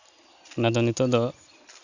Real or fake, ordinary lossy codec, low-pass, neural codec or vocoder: real; none; 7.2 kHz; none